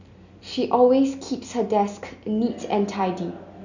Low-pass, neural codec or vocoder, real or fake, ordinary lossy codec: 7.2 kHz; none; real; none